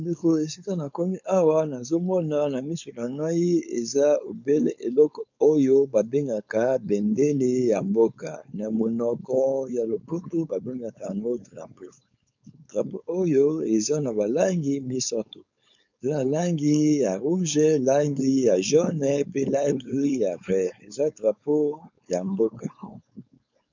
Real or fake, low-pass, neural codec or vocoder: fake; 7.2 kHz; codec, 16 kHz, 4.8 kbps, FACodec